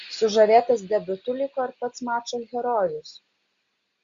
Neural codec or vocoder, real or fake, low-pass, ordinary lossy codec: none; real; 7.2 kHz; Opus, 64 kbps